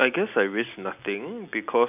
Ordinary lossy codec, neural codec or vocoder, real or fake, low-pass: none; none; real; 3.6 kHz